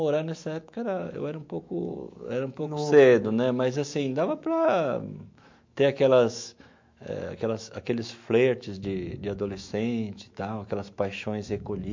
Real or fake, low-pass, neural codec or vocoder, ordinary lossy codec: fake; 7.2 kHz; autoencoder, 48 kHz, 128 numbers a frame, DAC-VAE, trained on Japanese speech; MP3, 48 kbps